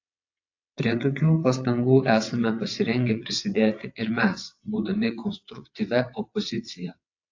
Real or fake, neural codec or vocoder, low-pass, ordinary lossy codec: fake; vocoder, 22.05 kHz, 80 mel bands, WaveNeXt; 7.2 kHz; AAC, 48 kbps